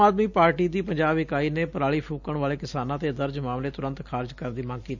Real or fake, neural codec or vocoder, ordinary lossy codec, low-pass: real; none; none; 7.2 kHz